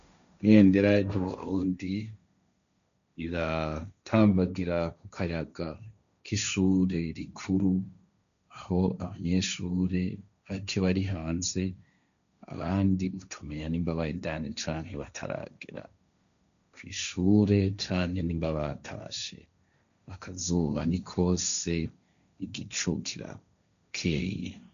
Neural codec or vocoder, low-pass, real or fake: codec, 16 kHz, 1.1 kbps, Voila-Tokenizer; 7.2 kHz; fake